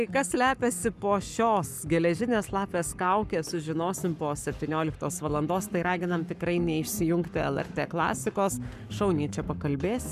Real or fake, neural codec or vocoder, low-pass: fake; codec, 44.1 kHz, 7.8 kbps, Pupu-Codec; 14.4 kHz